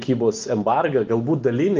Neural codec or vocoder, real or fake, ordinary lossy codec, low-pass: none; real; Opus, 32 kbps; 7.2 kHz